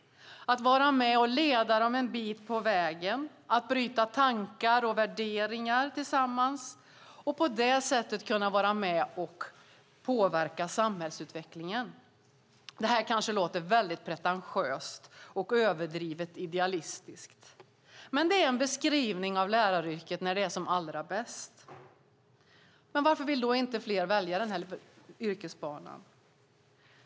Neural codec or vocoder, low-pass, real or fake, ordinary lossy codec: none; none; real; none